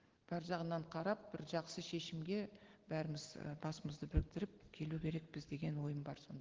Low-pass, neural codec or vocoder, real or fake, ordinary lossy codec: 7.2 kHz; none; real; Opus, 16 kbps